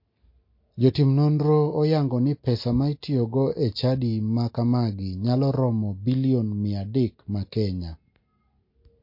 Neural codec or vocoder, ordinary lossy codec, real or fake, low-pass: none; MP3, 32 kbps; real; 5.4 kHz